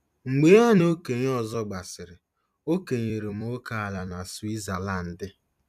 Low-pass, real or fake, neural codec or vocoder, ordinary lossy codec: 14.4 kHz; fake; vocoder, 44.1 kHz, 128 mel bands every 256 samples, BigVGAN v2; none